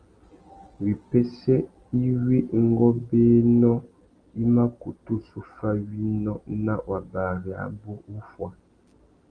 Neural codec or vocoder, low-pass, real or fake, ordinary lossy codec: none; 9.9 kHz; real; Opus, 24 kbps